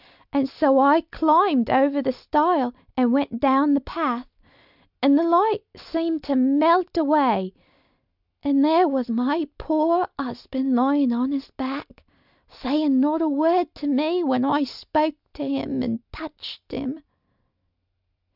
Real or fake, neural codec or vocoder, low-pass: real; none; 5.4 kHz